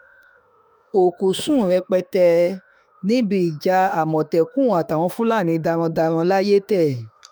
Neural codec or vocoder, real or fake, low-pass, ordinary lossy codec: autoencoder, 48 kHz, 32 numbers a frame, DAC-VAE, trained on Japanese speech; fake; none; none